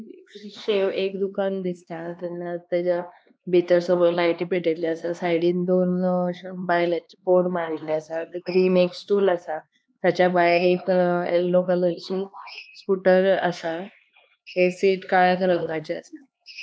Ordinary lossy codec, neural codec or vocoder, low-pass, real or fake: none; codec, 16 kHz, 2 kbps, X-Codec, HuBERT features, trained on LibriSpeech; none; fake